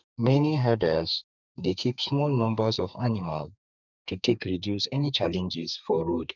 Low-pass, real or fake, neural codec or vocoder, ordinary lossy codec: 7.2 kHz; fake; codec, 32 kHz, 1.9 kbps, SNAC; none